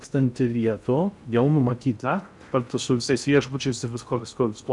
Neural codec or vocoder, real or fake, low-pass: codec, 16 kHz in and 24 kHz out, 0.6 kbps, FocalCodec, streaming, 2048 codes; fake; 10.8 kHz